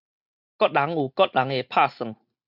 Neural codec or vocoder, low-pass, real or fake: none; 5.4 kHz; real